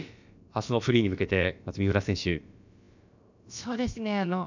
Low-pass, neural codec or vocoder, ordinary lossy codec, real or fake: 7.2 kHz; codec, 16 kHz, about 1 kbps, DyCAST, with the encoder's durations; none; fake